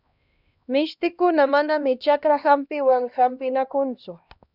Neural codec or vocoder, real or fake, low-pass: codec, 16 kHz, 1 kbps, X-Codec, HuBERT features, trained on LibriSpeech; fake; 5.4 kHz